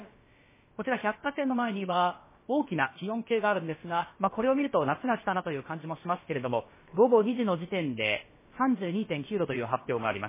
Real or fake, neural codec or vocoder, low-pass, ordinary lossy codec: fake; codec, 16 kHz, about 1 kbps, DyCAST, with the encoder's durations; 3.6 kHz; MP3, 16 kbps